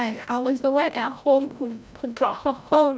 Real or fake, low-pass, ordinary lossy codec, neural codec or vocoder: fake; none; none; codec, 16 kHz, 0.5 kbps, FreqCodec, larger model